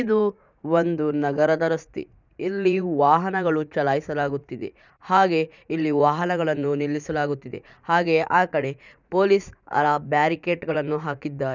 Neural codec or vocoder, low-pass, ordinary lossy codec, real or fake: vocoder, 44.1 kHz, 80 mel bands, Vocos; 7.2 kHz; none; fake